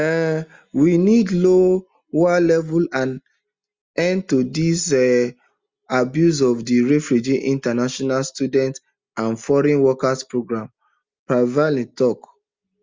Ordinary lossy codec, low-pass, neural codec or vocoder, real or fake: Opus, 32 kbps; 7.2 kHz; none; real